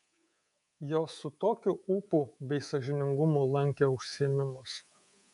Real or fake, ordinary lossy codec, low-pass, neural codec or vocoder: fake; MP3, 64 kbps; 10.8 kHz; codec, 24 kHz, 3.1 kbps, DualCodec